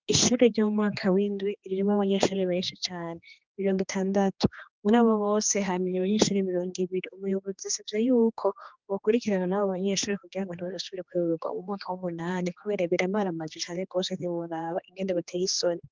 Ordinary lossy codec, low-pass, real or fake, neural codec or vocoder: Opus, 32 kbps; 7.2 kHz; fake; codec, 16 kHz, 2 kbps, X-Codec, HuBERT features, trained on general audio